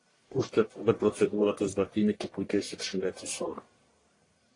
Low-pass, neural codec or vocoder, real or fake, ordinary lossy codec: 10.8 kHz; codec, 44.1 kHz, 1.7 kbps, Pupu-Codec; fake; AAC, 32 kbps